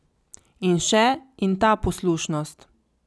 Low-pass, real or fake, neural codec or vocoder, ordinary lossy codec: none; real; none; none